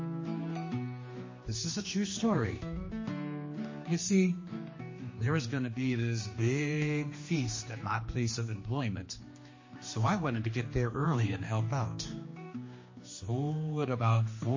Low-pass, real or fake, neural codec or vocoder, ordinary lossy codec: 7.2 kHz; fake; codec, 16 kHz, 2 kbps, X-Codec, HuBERT features, trained on general audio; MP3, 32 kbps